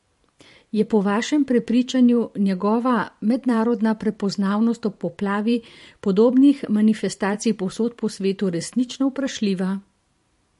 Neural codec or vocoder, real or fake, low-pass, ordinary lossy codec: vocoder, 44.1 kHz, 128 mel bands every 512 samples, BigVGAN v2; fake; 14.4 kHz; MP3, 48 kbps